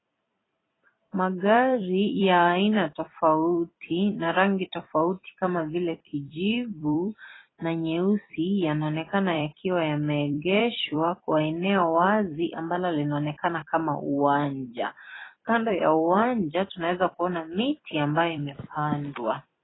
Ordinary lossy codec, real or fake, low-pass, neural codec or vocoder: AAC, 16 kbps; fake; 7.2 kHz; vocoder, 44.1 kHz, 128 mel bands every 256 samples, BigVGAN v2